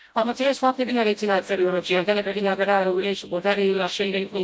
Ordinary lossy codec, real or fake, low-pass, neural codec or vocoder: none; fake; none; codec, 16 kHz, 0.5 kbps, FreqCodec, smaller model